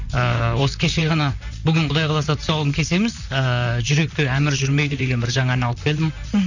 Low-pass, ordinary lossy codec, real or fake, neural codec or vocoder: 7.2 kHz; none; fake; vocoder, 44.1 kHz, 128 mel bands, Pupu-Vocoder